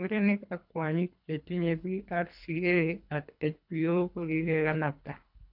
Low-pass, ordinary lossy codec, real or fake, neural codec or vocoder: 5.4 kHz; none; fake; codec, 24 kHz, 3 kbps, HILCodec